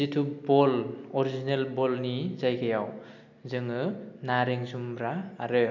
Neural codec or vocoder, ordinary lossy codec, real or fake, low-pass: none; none; real; 7.2 kHz